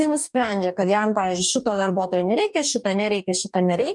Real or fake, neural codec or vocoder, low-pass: fake; codec, 44.1 kHz, 2.6 kbps, DAC; 10.8 kHz